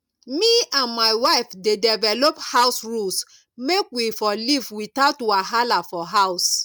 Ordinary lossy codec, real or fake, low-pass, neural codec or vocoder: none; real; 19.8 kHz; none